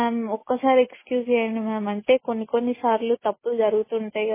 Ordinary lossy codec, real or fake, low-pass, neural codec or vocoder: MP3, 16 kbps; real; 3.6 kHz; none